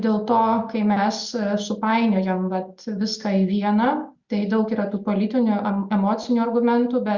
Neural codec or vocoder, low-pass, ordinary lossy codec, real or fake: none; 7.2 kHz; Opus, 64 kbps; real